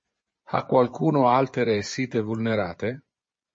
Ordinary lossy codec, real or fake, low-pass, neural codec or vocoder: MP3, 32 kbps; fake; 9.9 kHz; vocoder, 24 kHz, 100 mel bands, Vocos